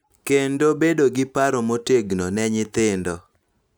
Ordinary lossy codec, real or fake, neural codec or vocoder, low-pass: none; real; none; none